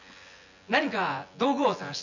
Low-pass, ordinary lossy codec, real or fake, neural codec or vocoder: 7.2 kHz; none; fake; vocoder, 24 kHz, 100 mel bands, Vocos